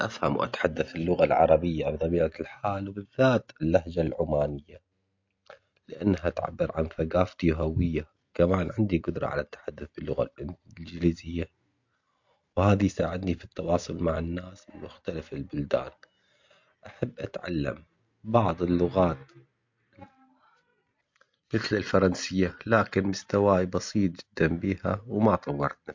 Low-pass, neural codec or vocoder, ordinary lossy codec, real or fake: 7.2 kHz; none; MP3, 48 kbps; real